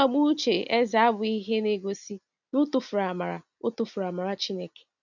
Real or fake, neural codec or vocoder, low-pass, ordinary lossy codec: real; none; 7.2 kHz; none